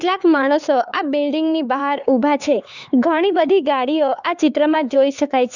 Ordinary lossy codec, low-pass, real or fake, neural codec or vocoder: none; 7.2 kHz; fake; codec, 16 kHz, 4 kbps, X-Codec, HuBERT features, trained on LibriSpeech